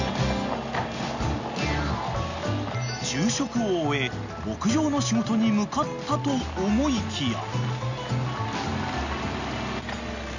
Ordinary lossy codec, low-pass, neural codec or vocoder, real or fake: none; 7.2 kHz; none; real